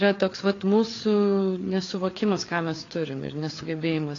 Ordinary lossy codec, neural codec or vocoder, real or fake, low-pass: AAC, 32 kbps; codec, 16 kHz, 4 kbps, FreqCodec, larger model; fake; 7.2 kHz